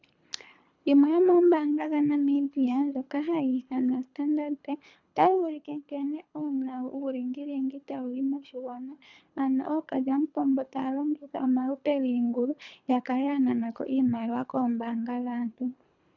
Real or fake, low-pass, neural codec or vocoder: fake; 7.2 kHz; codec, 24 kHz, 3 kbps, HILCodec